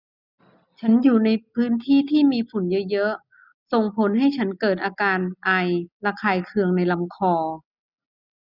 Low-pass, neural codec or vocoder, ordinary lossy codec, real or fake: 5.4 kHz; none; none; real